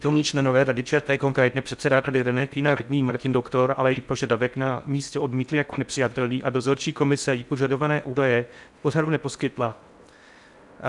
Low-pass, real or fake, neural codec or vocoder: 10.8 kHz; fake; codec, 16 kHz in and 24 kHz out, 0.6 kbps, FocalCodec, streaming, 4096 codes